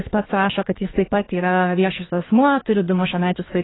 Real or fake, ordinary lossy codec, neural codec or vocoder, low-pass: fake; AAC, 16 kbps; codec, 44.1 kHz, 2.6 kbps, SNAC; 7.2 kHz